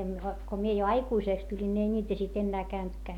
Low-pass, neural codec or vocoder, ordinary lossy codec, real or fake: 19.8 kHz; none; none; real